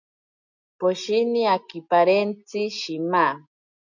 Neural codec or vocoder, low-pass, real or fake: none; 7.2 kHz; real